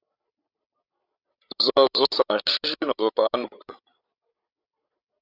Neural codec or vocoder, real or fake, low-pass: codec, 16 kHz, 16 kbps, FreqCodec, larger model; fake; 5.4 kHz